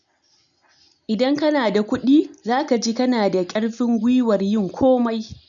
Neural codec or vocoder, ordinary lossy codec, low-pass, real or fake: none; none; 7.2 kHz; real